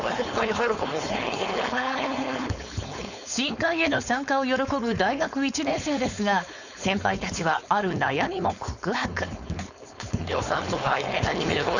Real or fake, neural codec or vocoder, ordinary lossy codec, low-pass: fake; codec, 16 kHz, 4.8 kbps, FACodec; none; 7.2 kHz